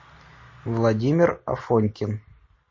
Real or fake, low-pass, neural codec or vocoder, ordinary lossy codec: real; 7.2 kHz; none; MP3, 32 kbps